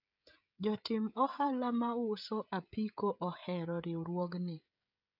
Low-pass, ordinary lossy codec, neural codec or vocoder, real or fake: 5.4 kHz; none; codec, 16 kHz, 16 kbps, FreqCodec, smaller model; fake